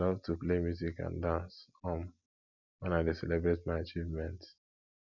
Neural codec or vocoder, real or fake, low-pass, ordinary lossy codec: none; real; 7.2 kHz; none